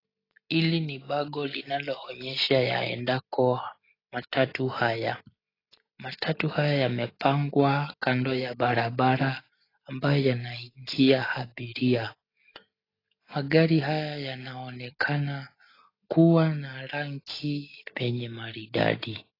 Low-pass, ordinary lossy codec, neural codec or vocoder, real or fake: 5.4 kHz; AAC, 24 kbps; none; real